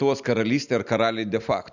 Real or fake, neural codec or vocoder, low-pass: real; none; 7.2 kHz